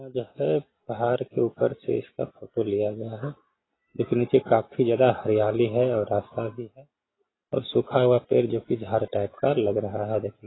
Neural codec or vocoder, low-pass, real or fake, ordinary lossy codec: none; 7.2 kHz; real; AAC, 16 kbps